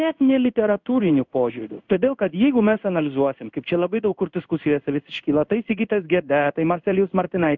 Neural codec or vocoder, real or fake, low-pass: codec, 16 kHz in and 24 kHz out, 1 kbps, XY-Tokenizer; fake; 7.2 kHz